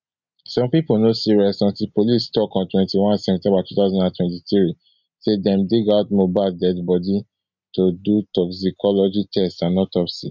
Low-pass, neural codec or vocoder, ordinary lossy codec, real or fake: 7.2 kHz; none; none; real